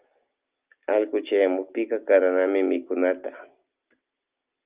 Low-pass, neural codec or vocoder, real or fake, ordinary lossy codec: 3.6 kHz; none; real; Opus, 24 kbps